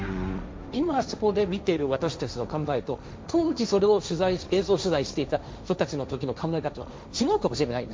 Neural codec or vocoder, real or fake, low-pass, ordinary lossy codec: codec, 16 kHz, 1.1 kbps, Voila-Tokenizer; fake; none; none